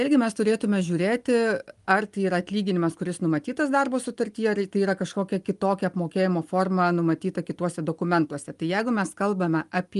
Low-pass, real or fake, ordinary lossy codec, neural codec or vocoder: 10.8 kHz; real; Opus, 32 kbps; none